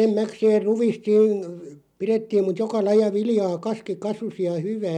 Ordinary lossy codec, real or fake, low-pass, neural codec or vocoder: none; real; 19.8 kHz; none